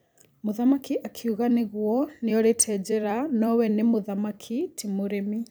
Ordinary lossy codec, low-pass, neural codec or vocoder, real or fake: none; none; vocoder, 44.1 kHz, 128 mel bands every 256 samples, BigVGAN v2; fake